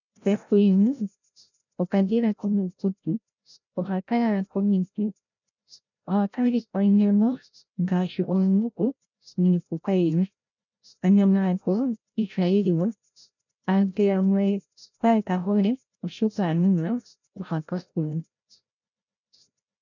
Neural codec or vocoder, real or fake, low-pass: codec, 16 kHz, 0.5 kbps, FreqCodec, larger model; fake; 7.2 kHz